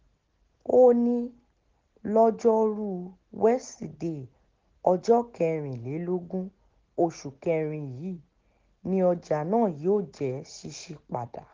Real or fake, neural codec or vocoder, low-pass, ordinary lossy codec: real; none; 7.2 kHz; Opus, 16 kbps